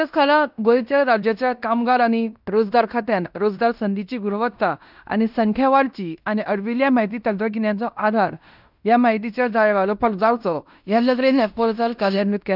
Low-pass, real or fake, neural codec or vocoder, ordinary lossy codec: 5.4 kHz; fake; codec, 16 kHz in and 24 kHz out, 0.9 kbps, LongCat-Audio-Codec, fine tuned four codebook decoder; none